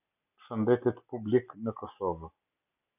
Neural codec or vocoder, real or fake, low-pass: none; real; 3.6 kHz